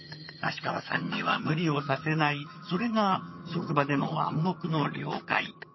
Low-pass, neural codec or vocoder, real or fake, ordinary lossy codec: 7.2 kHz; vocoder, 22.05 kHz, 80 mel bands, HiFi-GAN; fake; MP3, 24 kbps